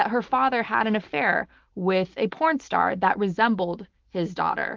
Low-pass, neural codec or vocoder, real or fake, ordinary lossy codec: 7.2 kHz; none; real; Opus, 32 kbps